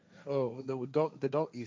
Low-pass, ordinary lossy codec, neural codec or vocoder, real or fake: none; none; codec, 16 kHz, 1.1 kbps, Voila-Tokenizer; fake